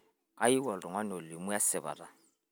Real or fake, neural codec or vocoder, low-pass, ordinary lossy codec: real; none; none; none